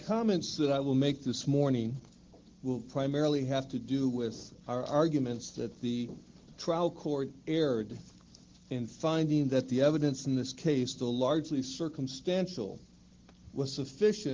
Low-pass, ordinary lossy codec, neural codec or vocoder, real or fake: 7.2 kHz; Opus, 16 kbps; none; real